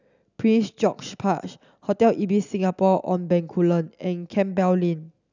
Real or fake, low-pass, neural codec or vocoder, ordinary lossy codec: real; 7.2 kHz; none; none